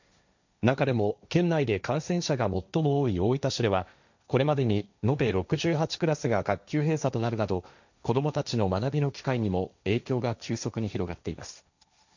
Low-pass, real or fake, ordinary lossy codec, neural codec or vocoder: 7.2 kHz; fake; none; codec, 16 kHz, 1.1 kbps, Voila-Tokenizer